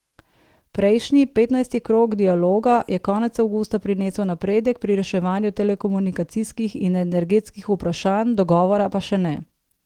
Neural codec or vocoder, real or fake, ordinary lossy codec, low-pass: autoencoder, 48 kHz, 128 numbers a frame, DAC-VAE, trained on Japanese speech; fake; Opus, 16 kbps; 19.8 kHz